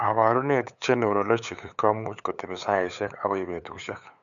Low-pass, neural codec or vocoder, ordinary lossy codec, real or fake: 7.2 kHz; codec, 16 kHz, 8 kbps, FunCodec, trained on LibriTTS, 25 frames a second; none; fake